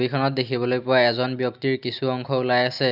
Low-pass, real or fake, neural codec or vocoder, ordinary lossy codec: 5.4 kHz; real; none; none